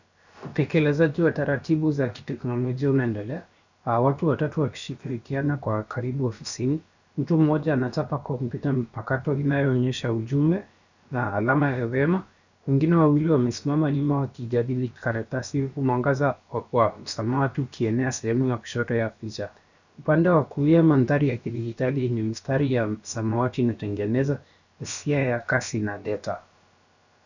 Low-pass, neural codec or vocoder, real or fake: 7.2 kHz; codec, 16 kHz, about 1 kbps, DyCAST, with the encoder's durations; fake